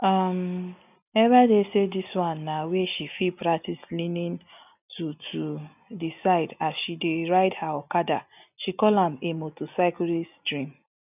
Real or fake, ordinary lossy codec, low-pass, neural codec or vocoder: real; none; 3.6 kHz; none